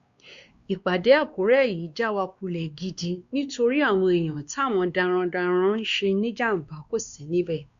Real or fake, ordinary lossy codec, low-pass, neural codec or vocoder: fake; Opus, 64 kbps; 7.2 kHz; codec, 16 kHz, 2 kbps, X-Codec, WavLM features, trained on Multilingual LibriSpeech